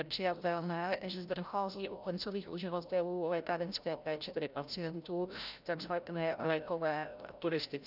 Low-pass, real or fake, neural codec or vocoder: 5.4 kHz; fake; codec, 16 kHz, 0.5 kbps, FreqCodec, larger model